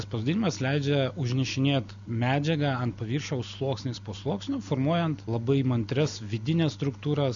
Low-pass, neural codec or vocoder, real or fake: 7.2 kHz; none; real